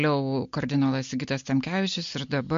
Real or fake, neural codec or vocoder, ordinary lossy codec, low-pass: real; none; MP3, 48 kbps; 7.2 kHz